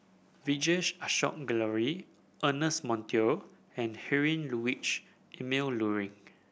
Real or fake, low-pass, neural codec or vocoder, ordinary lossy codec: real; none; none; none